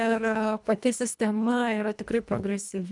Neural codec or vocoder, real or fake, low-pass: codec, 24 kHz, 1.5 kbps, HILCodec; fake; 10.8 kHz